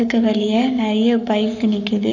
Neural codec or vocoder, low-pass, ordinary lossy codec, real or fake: codec, 44.1 kHz, 7.8 kbps, Pupu-Codec; 7.2 kHz; none; fake